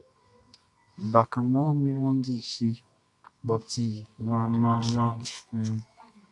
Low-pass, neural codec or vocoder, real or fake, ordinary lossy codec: 10.8 kHz; codec, 24 kHz, 0.9 kbps, WavTokenizer, medium music audio release; fake; AAC, 64 kbps